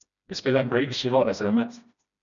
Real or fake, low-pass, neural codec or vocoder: fake; 7.2 kHz; codec, 16 kHz, 1 kbps, FreqCodec, smaller model